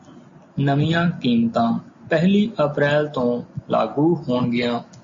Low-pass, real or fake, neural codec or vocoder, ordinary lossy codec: 7.2 kHz; real; none; MP3, 32 kbps